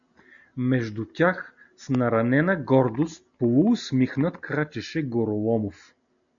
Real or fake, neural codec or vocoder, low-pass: real; none; 7.2 kHz